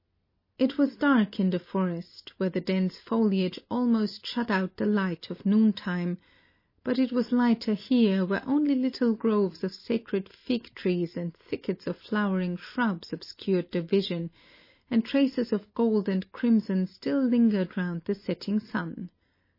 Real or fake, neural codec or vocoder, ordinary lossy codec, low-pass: real; none; MP3, 24 kbps; 5.4 kHz